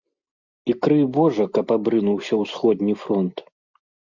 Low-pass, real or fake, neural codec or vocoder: 7.2 kHz; real; none